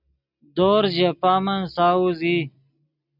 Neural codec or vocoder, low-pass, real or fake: none; 5.4 kHz; real